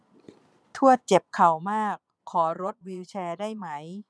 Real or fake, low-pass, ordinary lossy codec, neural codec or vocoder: fake; none; none; vocoder, 22.05 kHz, 80 mel bands, Vocos